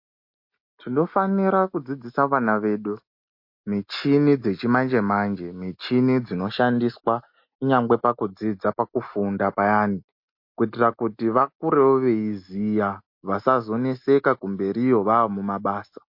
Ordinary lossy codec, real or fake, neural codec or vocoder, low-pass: MP3, 32 kbps; real; none; 5.4 kHz